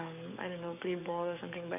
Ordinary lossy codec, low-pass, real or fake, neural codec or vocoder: none; 3.6 kHz; fake; codec, 44.1 kHz, 7.8 kbps, DAC